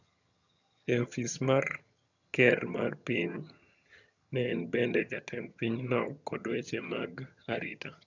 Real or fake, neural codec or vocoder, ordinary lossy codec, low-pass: fake; vocoder, 22.05 kHz, 80 mel bands, HiFi-GAN; none; 7.2 kHz